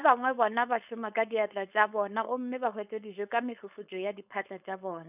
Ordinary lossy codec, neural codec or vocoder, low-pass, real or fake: none; codec, 16 kHz, 4.8 kbps, FACodec; 3.6 kHz; fake